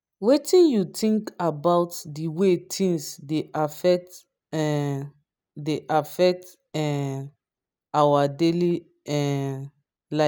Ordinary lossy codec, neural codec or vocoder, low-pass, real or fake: none; none; none; real